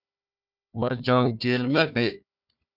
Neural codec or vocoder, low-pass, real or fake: codec, 16 kHz, 1 kbps, FunCodec, trained on Chinese and English, 50 frames a second; 5.4 kHz; fake